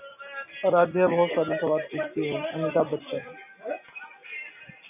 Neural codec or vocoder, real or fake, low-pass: none; real; 3.6 kHz